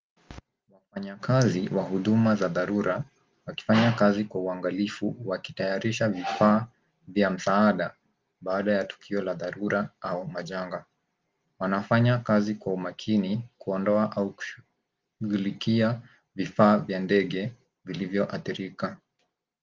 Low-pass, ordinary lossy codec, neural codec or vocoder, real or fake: 7.2 kHz; Opus, 32 kbps; none; real